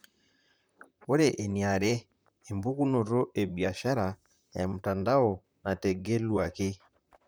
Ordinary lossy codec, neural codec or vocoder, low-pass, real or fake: none; vocoder, 44.1 kHz, 128 mel bands, Pupu-Vocoder; none; fake